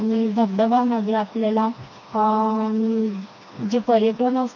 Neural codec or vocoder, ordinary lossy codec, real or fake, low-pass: codec, 16 kHz, 2 kbps, FreqCodec, smaller model; none; fake; 7.2 kHz